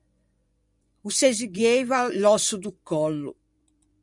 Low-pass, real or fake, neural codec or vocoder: 10.8 kHz; fake; vocoder, 24 kHz, 100 mel bands, Vocos